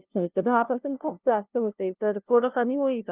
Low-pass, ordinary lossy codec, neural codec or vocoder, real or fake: 3.6 kHz; Opus, 32 kbps; codec, 16 kHz, 0.5 kbps, FunCodec, trained on LibriTTS, 25 frames a second; fake